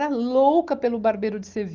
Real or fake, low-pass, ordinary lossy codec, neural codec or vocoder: real; 7.2 kHz; Opus, 32 kbps; none